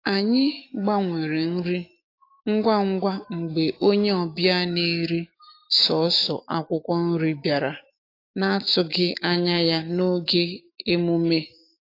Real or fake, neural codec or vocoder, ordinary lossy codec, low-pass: fake; autoencoder, 48 kHz, 128 numbers a frame, DAC-VAE, trained on Japanese speech; AAC, 32 kbps; 5.4 kHz